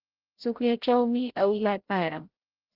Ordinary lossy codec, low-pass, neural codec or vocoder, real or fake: Opus, 16 kbps; 5.4 kHz; codec, 16 kHz, 0.5 kbps, FreqCodec, larger model; fake